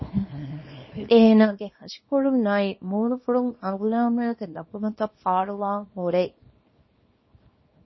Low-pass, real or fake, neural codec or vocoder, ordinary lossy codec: 7.2 kHz; fake; codec, 24 kHz, 0.9 kbps, WavTokenizer, small release; MP3, 24 kbps